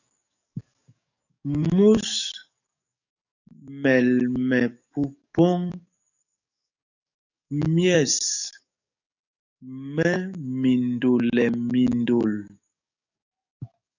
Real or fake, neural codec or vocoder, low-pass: fake; codec, 44.1 kHz, 7.8 kbps, DAC; 7.2 kHz